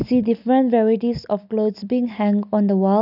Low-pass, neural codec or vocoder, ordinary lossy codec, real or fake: 5.4 kHz; none; none; real